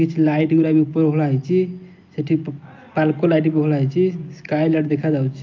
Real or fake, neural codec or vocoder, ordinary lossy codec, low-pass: real; none; Opus, 24 kbps; 7.2 kHz